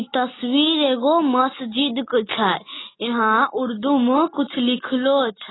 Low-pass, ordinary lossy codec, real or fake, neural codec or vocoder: 7.2 kHz; AAC, 16 kbps; real; none